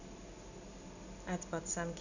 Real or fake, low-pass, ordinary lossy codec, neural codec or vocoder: real; 7.2 kHz; none; none